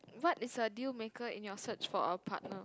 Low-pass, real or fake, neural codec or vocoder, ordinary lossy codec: none; real; none; none